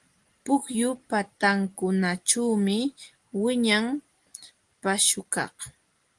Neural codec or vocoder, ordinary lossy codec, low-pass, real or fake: none; Opus, 24 kbps; 10.8 kHz; real